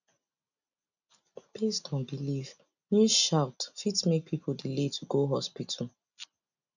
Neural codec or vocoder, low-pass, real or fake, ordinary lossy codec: none; 7.2 kHz; real; none